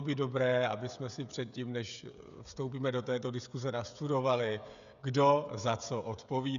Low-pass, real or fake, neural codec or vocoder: 7.2 kHz; fake; codec, 16 kHz, 16 kbps, FreqCodec, smaller model